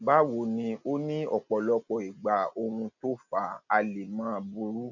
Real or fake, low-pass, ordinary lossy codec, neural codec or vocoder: real; 7.2 kHz; none; none